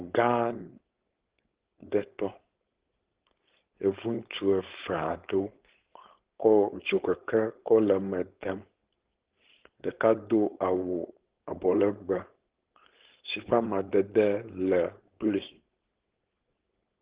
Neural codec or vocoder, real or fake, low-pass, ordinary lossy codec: codec, 16 kHz, 4.8 kbps, FACodec; fake; 3.6 kHz; Opus, 16 kbps